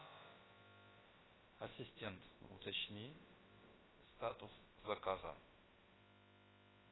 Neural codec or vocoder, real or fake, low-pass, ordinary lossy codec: codec, 16 kHz, about 1 kbps, DyCAST, with the encoder's durations; fake; 7.2 kHz; AAC, 16 kbps